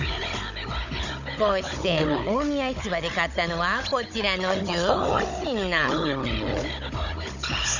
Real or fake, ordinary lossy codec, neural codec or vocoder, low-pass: fake; none; codec, 16 kHz, 16 kbps, FunCodec, trained on Chinese and English, 50 frames a second; 7.2 kHz